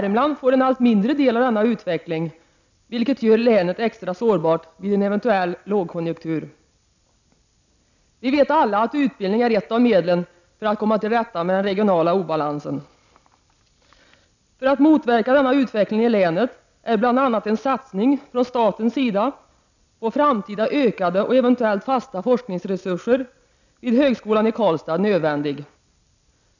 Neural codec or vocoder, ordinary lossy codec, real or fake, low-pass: none; none; real; 7.2 kHz